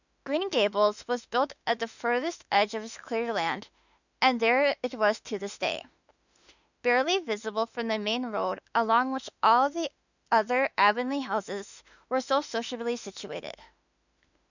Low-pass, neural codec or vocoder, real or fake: 7.2 kHz; autoencoder, 48 kHz, 32 numbers a frame, DAC-VAE, trained on Japanese speech; fake